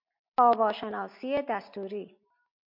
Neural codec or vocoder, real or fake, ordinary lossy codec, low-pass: none; real; MP3, 48 kbps; 5.4 kHz